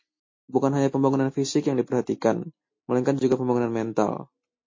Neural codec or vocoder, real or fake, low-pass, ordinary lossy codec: none; real; 7.2 kHz; MP3, 32 kbps